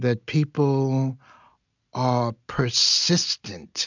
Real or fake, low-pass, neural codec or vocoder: real; 7.2 kHz; none